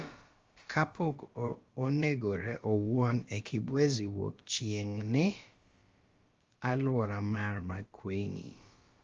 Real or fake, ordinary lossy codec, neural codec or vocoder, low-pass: fake; Opus, 32 kbps; codec, 16 kHz, about 1 kbps, DyCAST, with the encoder's durations; 7.2 kHz